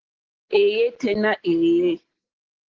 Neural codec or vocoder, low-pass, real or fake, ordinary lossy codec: none; 7.2 kHz; real; Opus, 16 kbps